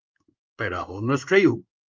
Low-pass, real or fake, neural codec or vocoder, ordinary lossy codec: 7.2 kHz; fake; codec, 16 kHz in and 24 kHz out, 1 kbps, XY-Tokenizer; Opus, 24 kbps